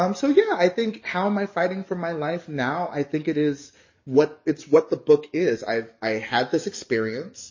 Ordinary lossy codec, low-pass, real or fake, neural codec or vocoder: MP3, 32 kbps; 7.2 kHz; fake; codec, 44.1 kHz, 7.8 kbps, DAC